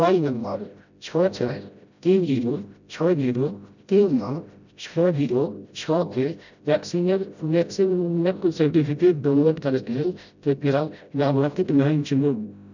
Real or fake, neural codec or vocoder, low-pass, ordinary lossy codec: fake; codec, 16 kHz, 0.5 kbps, FreqCodec, smaller model; 7.2 kHz; none